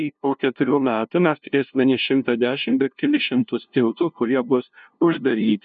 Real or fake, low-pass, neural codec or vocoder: fake; 7.2 kHz; codec, 16 kHz, 0.5 kbps, FunCodec, trained on LibriTTS, 25 frames a second